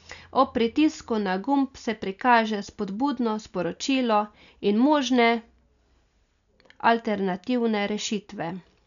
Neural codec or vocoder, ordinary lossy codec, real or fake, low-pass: none; none; real; 7.2 kHz